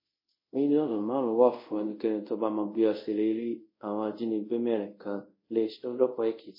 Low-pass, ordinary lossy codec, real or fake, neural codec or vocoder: 5.4 kHz; MP3, 24 kbps; fake; codec, 24 kHz, 0.5 kbps, DualCodec